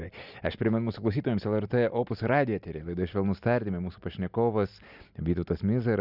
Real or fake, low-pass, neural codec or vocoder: real; 5.4 kHz; none